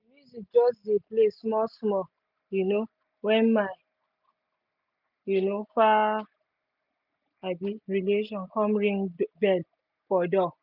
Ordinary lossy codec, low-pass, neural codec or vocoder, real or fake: none; 5.4 kHz; none; real